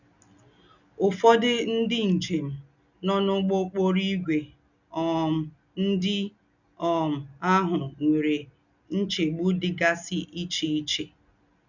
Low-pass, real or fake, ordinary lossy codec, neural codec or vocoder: 7.2 kHz; real; none; none